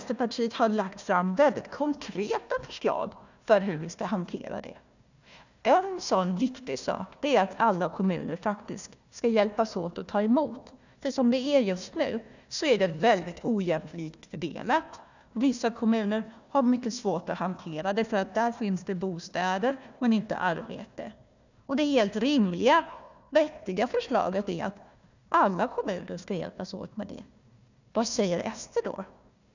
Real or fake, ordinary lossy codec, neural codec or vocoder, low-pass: fake; none; codec, 16 kHz, 1 kbps, FunCodec, trained on Chinese and English, 50 frames a second; 7.2 kHz